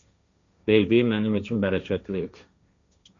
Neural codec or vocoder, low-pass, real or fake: codec, 16 kHz, 1.1 kbps, Voila-Tokenizer; 7.2 kHz; fake